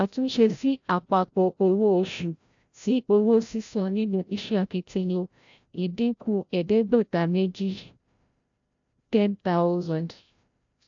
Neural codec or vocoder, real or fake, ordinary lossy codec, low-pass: codec, 16 kHz, 0.5 kbps, FreqCodec, larger model; fake; AAC, 64 kbps; 7.2 kHz